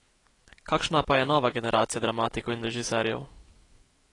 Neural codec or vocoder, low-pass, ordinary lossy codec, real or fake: autoencoder, 48 kHz, 128 numbers a frame, DAC-VAE, trained on Japanese speech; 10.8 kHz; AAC, 32 kbps; fake